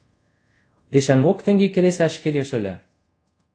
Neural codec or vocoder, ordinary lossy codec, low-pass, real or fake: codec, 24 kHz, 0.5 kbps, DualCodec; AAC, 48 kbps; 9.9 kHz; fake